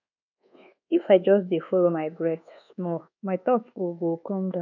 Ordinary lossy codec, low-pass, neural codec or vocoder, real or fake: none; 7.2 kHz; codec, 24 kHz, 1.2 kbps, DualCodec; fake